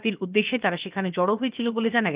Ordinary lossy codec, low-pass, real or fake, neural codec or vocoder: Opus, 24 kbps; 3.6 kHz; fake; codec, 16 kHz, about 1 kbps, DyCAST, with the encoder's durations